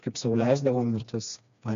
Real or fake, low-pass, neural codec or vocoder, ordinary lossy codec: fake; 7.2 kHz; codec, 16 kHz, 2 kbps, FreqCodec, smaller model; MP3, 64 kbps